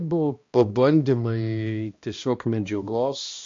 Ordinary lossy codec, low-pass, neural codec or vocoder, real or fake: MP3, 48 kbps; 7.2 kHz; codec, 16 kHz, 1 kbps, X-Codec, HuBERT features, trained on balanced general audio; fake